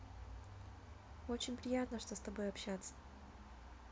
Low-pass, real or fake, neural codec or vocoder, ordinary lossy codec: none; real; none; none